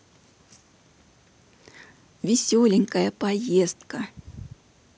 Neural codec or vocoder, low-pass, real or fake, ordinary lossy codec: none; none; real; none